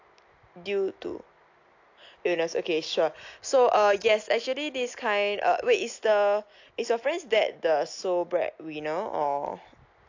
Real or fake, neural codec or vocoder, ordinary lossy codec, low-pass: real; none; AAC, 48 kbps; 7.2 kHz